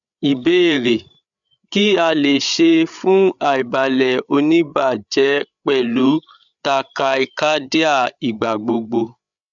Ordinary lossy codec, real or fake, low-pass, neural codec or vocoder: none; fake; 7.2 kHz; codec, 16 kHz, 8 kbps, FreqCodec, larger model